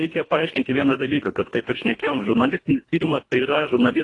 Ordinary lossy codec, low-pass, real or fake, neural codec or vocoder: AAC, 32 kbps; 10.8 kHz; fake; codec, 24 kHz, 1.5 kbps, HILCodec